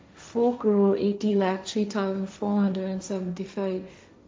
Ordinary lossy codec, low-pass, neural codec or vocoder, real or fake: none; none; codec, 16 kHz, 1.1 kbps, Voila-Tokenizer; fake